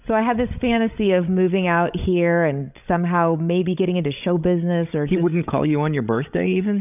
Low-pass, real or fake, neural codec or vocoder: 3.6 kHz; fake; codec, 16 kHz, 16 kbps, FreqCodec, larger model